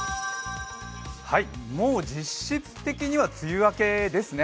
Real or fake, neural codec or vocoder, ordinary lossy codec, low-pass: real; none; none; none